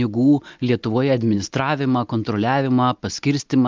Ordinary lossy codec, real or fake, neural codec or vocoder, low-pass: Opus, 32 kbps; real; none; 7.2 kHz